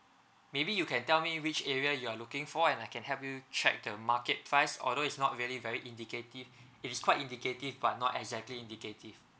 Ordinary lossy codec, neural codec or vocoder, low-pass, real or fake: none; none; none; real